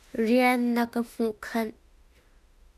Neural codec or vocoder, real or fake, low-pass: autoencoder, 48 kHz, 32 numbers a frame, DAC-VAE, trained on Japanese speech; fake; 14.4 kHz